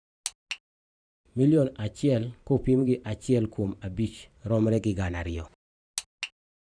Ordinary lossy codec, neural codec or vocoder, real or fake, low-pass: none; vocoder, 44.1 kHz, 128 mel bands every 512 samples, BigVGAN v2; fake; 9.9 kHz